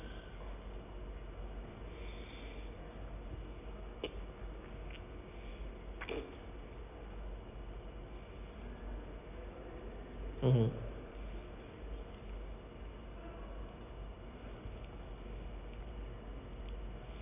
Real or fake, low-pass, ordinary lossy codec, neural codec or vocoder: real; 3.6 kHz; none; none